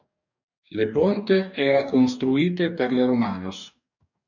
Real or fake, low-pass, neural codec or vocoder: fake; 7.2 kHz; codec, 44.1 kHz, 2.6 kbps, DAC